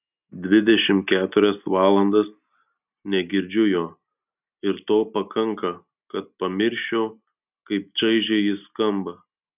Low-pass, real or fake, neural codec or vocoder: 3.6 kHz; real; none